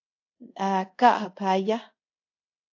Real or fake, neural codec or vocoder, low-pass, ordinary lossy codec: fake; codec, 24 kHz, 0.5 kbps, DualCodec; 7.2 kHz; AAC, 48 kbps